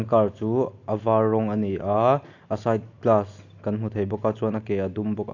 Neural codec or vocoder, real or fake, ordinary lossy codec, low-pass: none; real; AAC, 48 kbps; 7.2 kHz